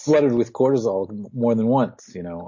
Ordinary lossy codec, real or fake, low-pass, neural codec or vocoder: MP3, 32 kbps; real; 7.2 kHz; none